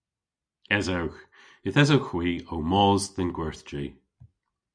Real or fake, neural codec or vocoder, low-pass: real; none; 9.9 kHz